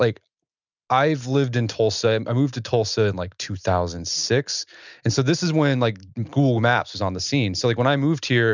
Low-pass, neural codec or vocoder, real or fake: 7.2 kHz; none; real